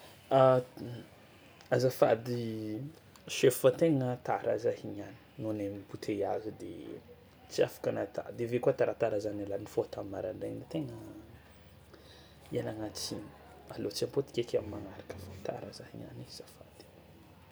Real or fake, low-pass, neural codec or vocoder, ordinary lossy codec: real; none; none; none